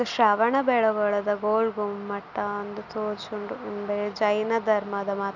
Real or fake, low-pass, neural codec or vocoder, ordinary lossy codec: real; 7.2 kHz; none; none